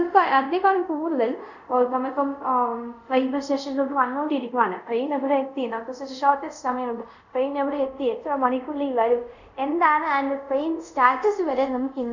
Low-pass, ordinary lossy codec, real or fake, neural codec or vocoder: 7.2 kHz; none; fake; codec, 24 kHz, 0.5 kbps, DualCodec